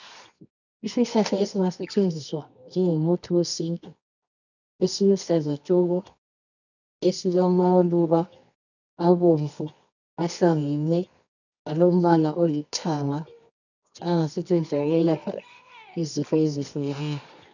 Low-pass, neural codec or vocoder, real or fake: 7.2 kHz; codec, 24 kHz, 0.9 kbps, WavTokenizer, medium music audio release; fake